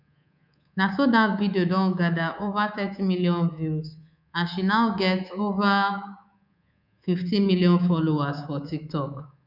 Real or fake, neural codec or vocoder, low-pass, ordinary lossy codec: fake; codec, 24 kHz, 3.1 kbps, DualCodec; 5.4 kHz; none